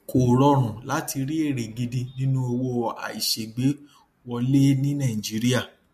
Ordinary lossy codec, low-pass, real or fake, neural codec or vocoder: MP3, 64 kbps; 14.4 kHz; real; none